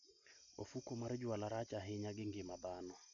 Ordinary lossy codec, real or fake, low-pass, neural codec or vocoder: none; real; 7.2 kHz; none